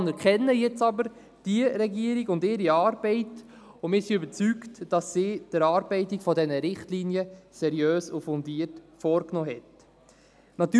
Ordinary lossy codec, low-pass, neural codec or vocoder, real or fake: none; none; none; real